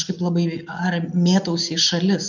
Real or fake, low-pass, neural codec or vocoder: real; 7.2 kHz; none